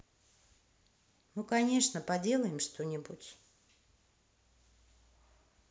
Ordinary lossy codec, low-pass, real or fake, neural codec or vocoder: none; none; real; none